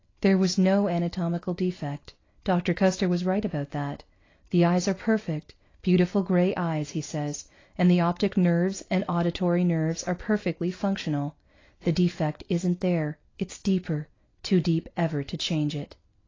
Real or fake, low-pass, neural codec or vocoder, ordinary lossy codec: real; 7.2 kHz; none; AAC, 32 kbps